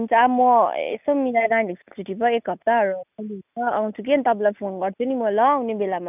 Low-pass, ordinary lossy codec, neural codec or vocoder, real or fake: 3.6 kHz; none; none; real